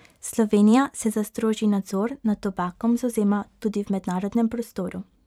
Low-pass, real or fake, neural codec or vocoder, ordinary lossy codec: 19.8 kHz; fake; vocoder, 44.1 kHz, 128 mel bands every 512 samples, BigVGAN v2; none